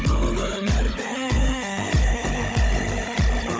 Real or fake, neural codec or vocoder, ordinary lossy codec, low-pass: fake; codec, 16 kHz, 16 kbps, FunCodec, trained on Chinese and English, 50 frames a second; none; none